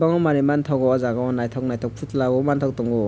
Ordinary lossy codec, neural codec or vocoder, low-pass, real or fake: none; none; none; real